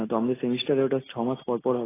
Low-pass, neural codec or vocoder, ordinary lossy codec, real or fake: 3.6 kHz; none; AAC, 16 kbps; real